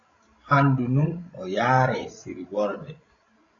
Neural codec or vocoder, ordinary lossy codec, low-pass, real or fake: codec, 16 kHz, 16 kbps, FreqCodec, larger model; AAC, 32 kbps; 7.2 kHz; fake